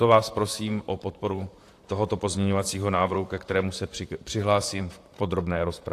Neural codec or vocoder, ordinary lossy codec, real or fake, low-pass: vocoder, 44.1 kHz, 128 mel bands, Pupu-Vocoder; AAC, 64 kbps; fake; 14.4 kHz